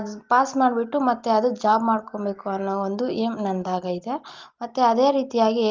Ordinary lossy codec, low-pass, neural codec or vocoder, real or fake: Opus, 32 kbps; 7.2 kHz; none; real